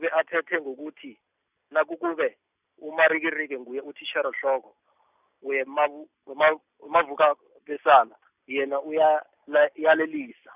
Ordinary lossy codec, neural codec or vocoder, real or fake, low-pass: none; none; real; 3.6 kHz